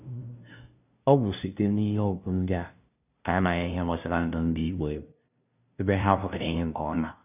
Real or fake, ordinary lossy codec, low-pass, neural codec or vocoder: fake; none; 3.6 kHz; codec, 16 kHz, 0.5 kbps, FunCodec, trained on LibriTTS, 25 frames a second